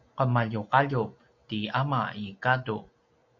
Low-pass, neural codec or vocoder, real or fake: 7.2 kHz; none; real